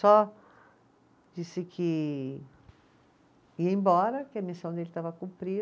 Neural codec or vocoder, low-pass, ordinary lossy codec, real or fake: none; none; none; real